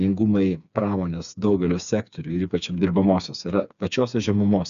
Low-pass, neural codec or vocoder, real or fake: 7.2 kHz; codec, 16 kHz, 4 kbps, FreqCodec, smaller model; fake